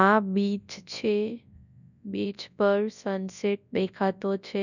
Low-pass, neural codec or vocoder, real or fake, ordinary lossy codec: 7.2 kHz; codec, 24 kHz, 0.9 kbps, WavTokenizer, large speech release; fake; none